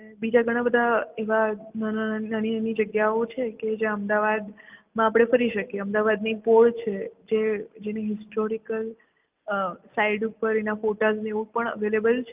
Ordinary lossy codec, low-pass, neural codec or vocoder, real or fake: Opus, 24 kbps; 3.6 kHz; none; real